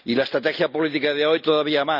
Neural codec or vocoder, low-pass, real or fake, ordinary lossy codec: none; 5.4 kHz; real; none